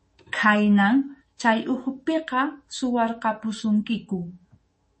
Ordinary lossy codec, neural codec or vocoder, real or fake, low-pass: MP3, 32 kbps; autoencoder, 48 kHz, 128 numbers a frame, DAC-VAE, trained on Japanese speech; fake; 10.8 kHz